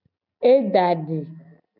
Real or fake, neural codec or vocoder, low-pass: real; none; 5.4 kHz